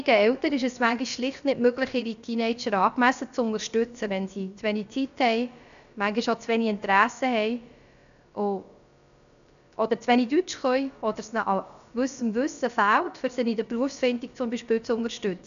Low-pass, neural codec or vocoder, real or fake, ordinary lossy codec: 7.2 kHz; codec, 16 kHz, about 1 kbps, DyCAST, with the encoder's durations; fake; none